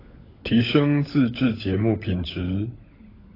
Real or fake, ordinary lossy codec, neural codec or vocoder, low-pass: fake; AAC, 24 kbps; codec, 16 kHz, 16 kbps, FunCodec, trained on LibriTTS, 50 frames a second; 5.4 kHz